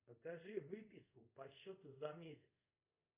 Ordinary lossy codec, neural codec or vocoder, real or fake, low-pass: Opus, 64 kbps; codec, 24 kHz, 0.5 kbps, DualCodec; fake; 3.6 kHz